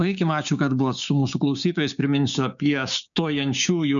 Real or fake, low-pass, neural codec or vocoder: fake; 7.2 kHz; codec, 16 kHz, 6 kbps, DAC